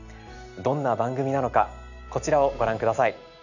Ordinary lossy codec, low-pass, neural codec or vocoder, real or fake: none; 7.2 kHz; none; real